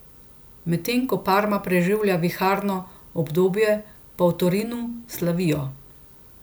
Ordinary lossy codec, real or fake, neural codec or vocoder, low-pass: none; real; none; none